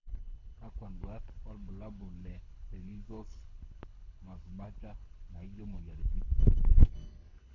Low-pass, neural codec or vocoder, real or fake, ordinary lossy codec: 7.2 kHz; codec, 24 kHz, 6 kbps, HILCodec; fake; none